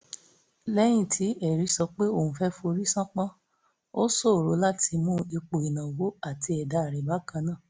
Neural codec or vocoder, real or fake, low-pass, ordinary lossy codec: none; real; none; none